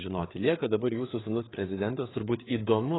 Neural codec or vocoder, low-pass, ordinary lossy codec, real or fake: codec, 16 kHz, 4 kbps, FreqCodec, larger model; 7.2 kHz; AAC, 16 kbps; fake